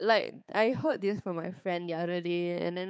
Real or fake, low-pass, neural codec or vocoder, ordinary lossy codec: fake; none; codec, 16 kHz, 4 kbps, X-Codec, HuBERT features, trained on balanced general audio; none